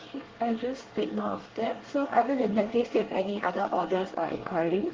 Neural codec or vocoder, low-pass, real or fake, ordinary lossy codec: codec, 24 kHz, 1 kbps, SNAC; 7.2 kHz; fake; Opus, 16 kbps